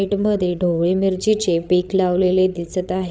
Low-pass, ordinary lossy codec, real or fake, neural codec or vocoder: none; none; fake; codec, 16 kHz, 16 kbps, FreqCodec, larger model